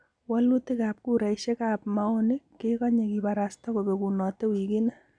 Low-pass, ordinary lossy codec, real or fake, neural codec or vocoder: 9.9 kHz; none; real; none